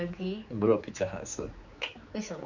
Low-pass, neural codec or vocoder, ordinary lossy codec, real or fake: 7.2 kHz; codec, 16 kHz, 4 kbps, X-Codec, HuBERT features, trained on general audio; none; fake